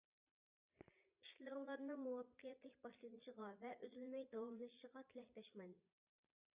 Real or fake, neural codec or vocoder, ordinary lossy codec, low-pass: fake; vocoder, 22.05 kHz, 80 mel bands, Vocos; Opus, 64 kbps; 3.6 kHz